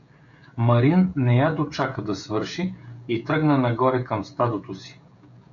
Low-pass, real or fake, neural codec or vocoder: 7.2 kHz; fake; codec, 16 kHz, 16 kbps, FreqCodec, smaller model